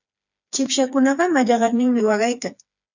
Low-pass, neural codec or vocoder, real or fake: 7.2 kHz; codec, 16 kHz, 4 kbps, FreqCodec, smaller model; fake